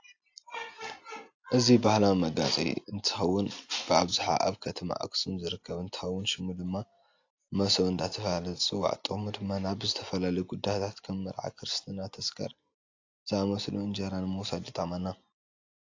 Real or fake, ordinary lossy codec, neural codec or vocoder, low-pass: real; AAC, 48 kbps; none; 7.2 kHz